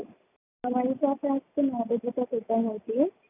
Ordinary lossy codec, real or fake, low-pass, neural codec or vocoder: none; real; 3.6 kHz; none